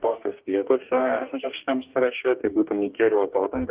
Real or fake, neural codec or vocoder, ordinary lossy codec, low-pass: fake; codec, 44.1 kHz, 2.6 kbps, DAC; Opus, 32 kbps; 3.6 kHz